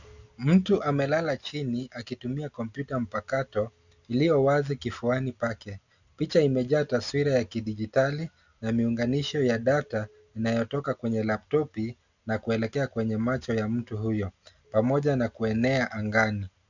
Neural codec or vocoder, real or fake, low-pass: none; real; 7.2 kHz